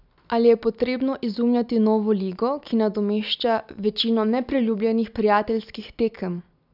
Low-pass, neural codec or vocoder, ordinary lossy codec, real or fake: 5.4 kHz; none; none; real